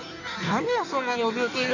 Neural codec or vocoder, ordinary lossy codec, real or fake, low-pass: codec, 16 kHz in and 24 kHz out, 1.1 kbps, FireRedTTS-2 codec; none; fake; 7.2 kHz